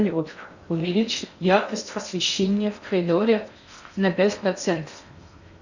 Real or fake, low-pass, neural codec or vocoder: fake; 7.2 kHz; codec, 16 kHz in and 24 kHz out, 0.6 kbps, FocalCodec, streaming, 4096 codes